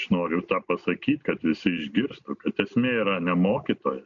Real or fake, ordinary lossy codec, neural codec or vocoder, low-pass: real; MP3, 48 kbps; none; 7.2 kHz